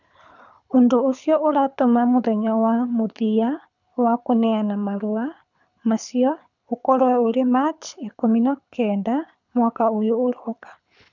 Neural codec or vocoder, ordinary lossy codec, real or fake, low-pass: codec, 24 kHz, 6 kbps, HILCodec; none; fake; 7.2 kHz